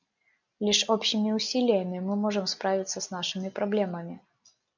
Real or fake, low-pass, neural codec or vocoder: real; 7.2 kHz; none